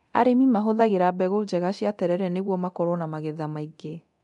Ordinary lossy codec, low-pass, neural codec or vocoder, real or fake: none; 10.8 kHz; codec, 24 kHz, 0.9 kbps, DualCodec; fake